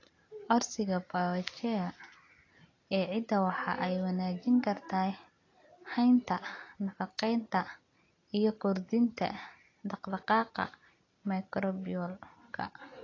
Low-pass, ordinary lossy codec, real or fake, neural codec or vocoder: 7.2 kHz; AAC, 32 kbps; real; none